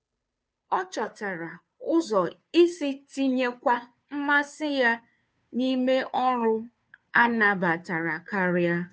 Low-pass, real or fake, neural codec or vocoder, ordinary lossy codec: none; fake; codec, 16 kHz, 2 kbps, FunCodec, trained on Chinese and English, 25 frames a second; none